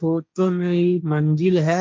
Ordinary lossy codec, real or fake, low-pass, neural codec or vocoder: none; fake; none; codec, 16 kHz, 1.1 kbps, Voila-Tokenizer